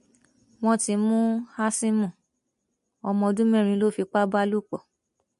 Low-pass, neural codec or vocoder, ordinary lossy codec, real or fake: 14.4 kHz; none; MP3, 48 kbps; real